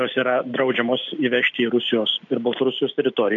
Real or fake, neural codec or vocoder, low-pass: real; none; 7.2 kHz